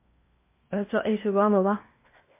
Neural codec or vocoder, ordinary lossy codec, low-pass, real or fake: codec, 16 kHz in and 24 kHz out, 0.8 kbps, FocalCodec, streaming, 65536 codes; MP3, 24 kbps; 3.6 kHz; fake